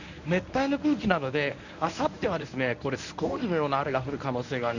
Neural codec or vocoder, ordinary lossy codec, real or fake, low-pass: codec, 24 kHz, 0.9 kbps, WavTokenizer, medium speech release version 1; none; fake; 7.2 kHz